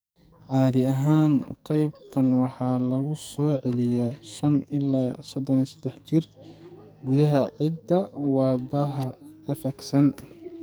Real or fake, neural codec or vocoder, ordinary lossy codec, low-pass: fake; codec, 44.1 kHz, 2.6 kbps, SNAC; none; none